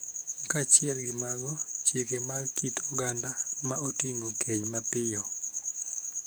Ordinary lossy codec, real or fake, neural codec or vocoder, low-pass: none; fake; codec, 44.1 kHz, 7.8 kbps, DAC; none